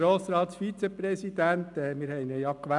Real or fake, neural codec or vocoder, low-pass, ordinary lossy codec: real; none; 10.8 kHz; none